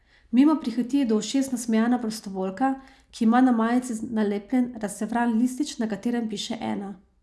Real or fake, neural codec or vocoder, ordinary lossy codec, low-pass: real; none; none; none